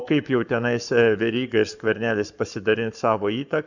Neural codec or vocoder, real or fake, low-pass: codec, 44.1 kHz, 7.8 kbps, Pupu-Codec; fake; 7.2 kHz